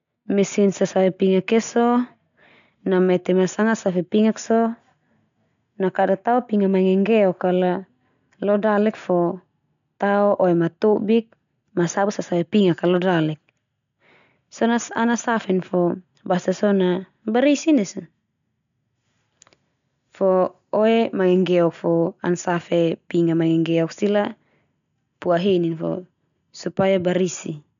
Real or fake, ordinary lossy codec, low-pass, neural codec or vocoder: real; none; 7.2 kHz; none